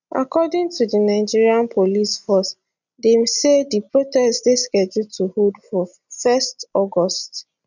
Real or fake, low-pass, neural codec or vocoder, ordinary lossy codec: real; 7.2 kHz; none; none